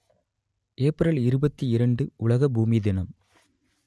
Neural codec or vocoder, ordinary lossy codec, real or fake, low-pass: none; none; real; none